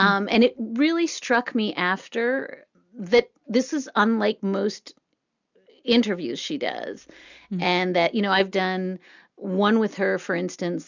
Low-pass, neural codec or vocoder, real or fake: 7.2 kHz; none; real